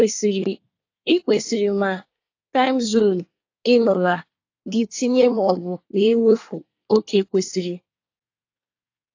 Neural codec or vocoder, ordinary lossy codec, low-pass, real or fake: codec, 24 kHz, 1 kbps, SNAC; AAC, 48 kbps; 7.2 kHz; fake